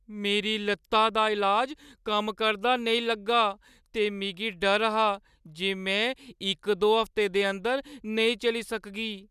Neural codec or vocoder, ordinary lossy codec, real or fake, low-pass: none; none; real; 14.4 kHz